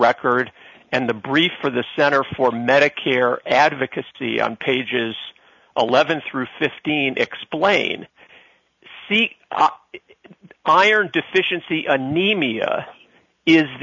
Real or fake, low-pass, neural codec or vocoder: real; 7.2 kHz; none